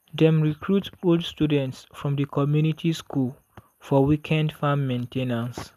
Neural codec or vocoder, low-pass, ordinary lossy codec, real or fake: none; 14.4 kHz; none; real